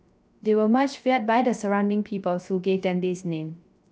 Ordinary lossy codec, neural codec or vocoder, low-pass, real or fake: none; codec, 16 kHz, 0.3 kbps, FocalCodec; none; fake